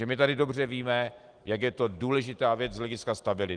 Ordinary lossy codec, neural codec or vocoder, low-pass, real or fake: Opus, 24 kbps; none; 9.9 kHz; real